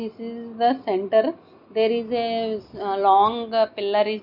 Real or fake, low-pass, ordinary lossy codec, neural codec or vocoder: real; 5.4 kHz; none; none